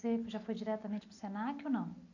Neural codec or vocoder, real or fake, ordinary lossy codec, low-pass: none; real; none; 7.2 kHz